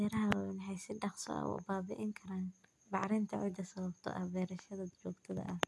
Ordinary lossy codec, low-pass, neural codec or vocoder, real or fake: none; none; none; real